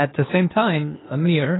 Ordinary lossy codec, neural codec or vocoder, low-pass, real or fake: AAC, 16 kbps; codec, 16 kHz, 0.8 kbps, ZipCodec; 7.2 kHz; fake